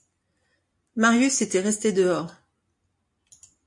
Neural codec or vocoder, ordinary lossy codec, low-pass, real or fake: none; MP3, 48 kbps; 10.8 kHz; real